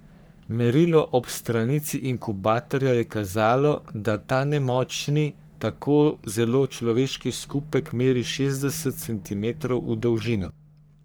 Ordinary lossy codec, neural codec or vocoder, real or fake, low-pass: none; codec, 44.1 kHz, 3.4 kbps, Pupu-Codec; fake; none